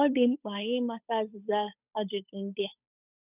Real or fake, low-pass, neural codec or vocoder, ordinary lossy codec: fake; 3.6 kHz; codec, 16 kHz, 8 kbps, FunCodec, trained on Chinese and English, 25 frames a second; none